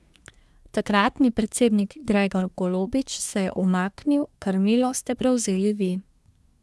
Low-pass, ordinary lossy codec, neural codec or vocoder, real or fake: none; none; codec, 24 kHz, 1 kbps, SNAC; fake